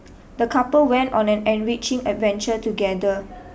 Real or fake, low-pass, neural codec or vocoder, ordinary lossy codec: real; none; none; none